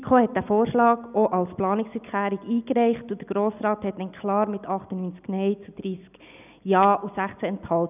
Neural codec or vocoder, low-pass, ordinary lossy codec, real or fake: none; 3.6 kHz; none; real